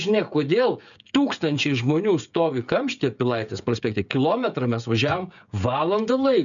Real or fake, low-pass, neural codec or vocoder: fake; 7.2 kHz; codec, 16 kHz, 16 kbps, FreqCodec, smaller model